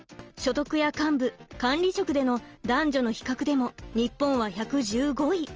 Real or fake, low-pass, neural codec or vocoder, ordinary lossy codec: real; 7.2 kHz; none; Opus, 24 kbps